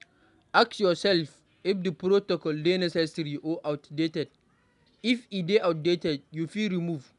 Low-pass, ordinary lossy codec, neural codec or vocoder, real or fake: 10.8 kHz; none; none; real